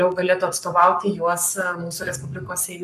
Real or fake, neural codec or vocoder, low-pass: fake; codec, 44.1 kHz, 7.8 kbps, Pupu-Codec; 14.4 kHz